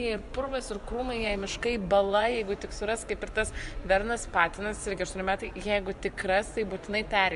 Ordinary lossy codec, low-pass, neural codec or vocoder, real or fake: AAC, 96 kbps; 10.8 kHz; vocoder, 24 kHz, 100 mel bands, Vocos; fake